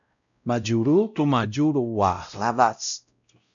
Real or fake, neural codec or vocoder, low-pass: fake; codec, 16 kHz, 0.5 kbps, X-Codec, WavLM features, trained on Multilingual LibriSpeech; 7.2 kHz